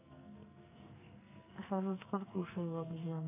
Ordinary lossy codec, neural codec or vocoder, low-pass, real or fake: none; codec, 44.1 kHz, 2.6 kbps, SNAC; 3.6 kHz; fake